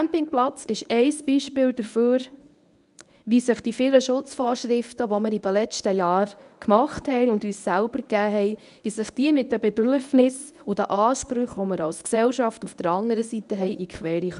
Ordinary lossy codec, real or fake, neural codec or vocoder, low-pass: none; fake; codec, 24 kHz, 0.9 kbps, WavTokenizer, medium speech release version 1; 10.8 kHz